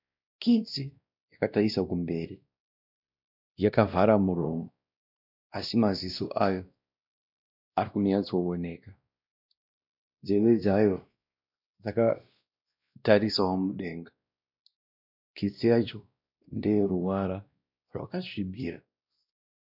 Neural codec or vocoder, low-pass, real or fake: codec, 16 kHz, 1 kbps, X-Codec, WavLM features, trained on Multilingual LibriSpeech; 5.4 kHz; fake